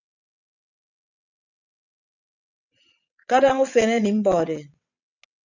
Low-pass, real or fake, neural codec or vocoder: 7.2 kHz; fake; vocoder, 22.05 kHz, 80 mel bands, WaveNeXt